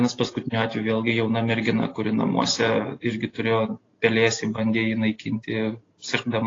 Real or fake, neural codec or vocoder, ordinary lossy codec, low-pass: real; none; AAC, 32 kbps; 7.2 kHz